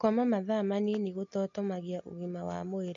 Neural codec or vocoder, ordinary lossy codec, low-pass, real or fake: none; MP3, 48 kbps; 7.2 kHz; real